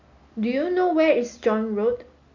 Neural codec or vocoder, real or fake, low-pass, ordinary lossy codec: none; real; 7.2 kHz; MP3, 48 kbps